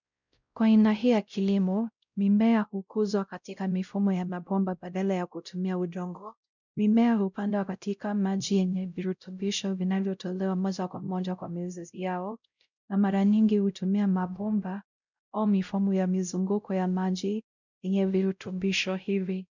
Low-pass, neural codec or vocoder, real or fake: 7.2 kHz; codec, 16 kHz, 0.5 kbps, X-Codec, WavLM features, trained on Multilingual LibriSpeech; fake